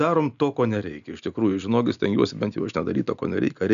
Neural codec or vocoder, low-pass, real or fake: none; 7.2 kHz; real